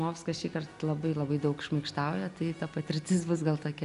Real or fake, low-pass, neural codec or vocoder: real; 10.8 kHz; none